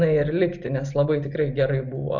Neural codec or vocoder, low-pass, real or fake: none; 7.2 kHz; real